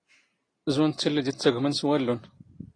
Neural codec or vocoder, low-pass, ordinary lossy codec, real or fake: none; 9.9 kHz; AAC, 32 kbps; real